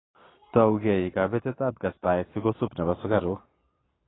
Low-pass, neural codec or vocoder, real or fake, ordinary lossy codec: 7.2 kHz; vocoder, 22.05 kHz, 80 mel bands, Vocos; fake; AAC, 16 kbps